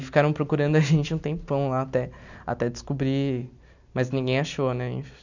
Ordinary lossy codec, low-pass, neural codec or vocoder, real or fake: none; 7.2 kHz; none; real